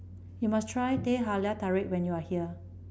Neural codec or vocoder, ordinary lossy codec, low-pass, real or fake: none; none; none; real